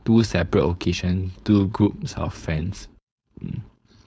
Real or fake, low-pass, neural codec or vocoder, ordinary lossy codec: fake; none; codec, 16 kHz, 4.8 kbps, FACodec; none